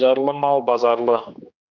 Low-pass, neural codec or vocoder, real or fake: 7.2 kHz; codec, 16 kHz, 2 kbps, X-Codec, HuBERT features, trained on general audio; fake